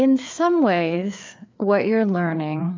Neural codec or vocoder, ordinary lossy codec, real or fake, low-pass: codec, 16 kHz, 4 kbps, FreqCodec, larger model; MP3, 48 kbps; fake; 7.2 kHz